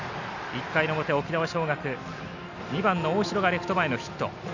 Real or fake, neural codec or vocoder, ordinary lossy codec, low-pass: real; none; none; 7.2 kHz